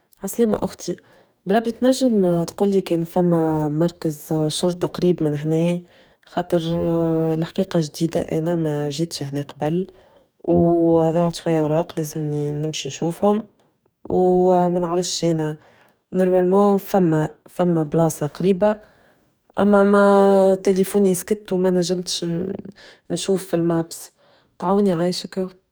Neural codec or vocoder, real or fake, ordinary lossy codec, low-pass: codec, 44.1 kHz, 2.6 kbps, DAC; fake; none; none